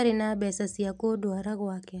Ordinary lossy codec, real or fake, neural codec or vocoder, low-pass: none; real; none; none